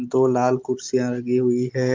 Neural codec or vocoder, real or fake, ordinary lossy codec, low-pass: none; real; Opus, 32 kbps; 7.2 kHz